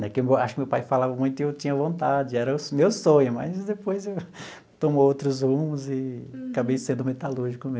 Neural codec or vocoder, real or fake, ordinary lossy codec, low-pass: none; real; none; none